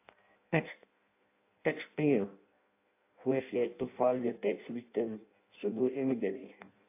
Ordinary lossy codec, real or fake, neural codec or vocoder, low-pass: AAC, 24 kbps; fake; codec, 16 kHz in and 24 kHz out, 0.6 kbps, FireRedTTS-2 codec; 3.6 kHz